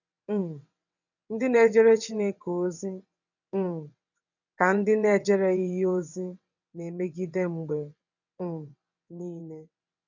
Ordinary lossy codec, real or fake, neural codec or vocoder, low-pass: none; fake; vocoder, 22.05 kHz, 80 mel bands, WaveNeXt; 7.2 kHz